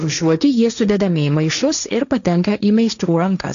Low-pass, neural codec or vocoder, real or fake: 7.2 kHz; codec, 16 kHz, 1.1 kbps, Voila-Tokenizer; fake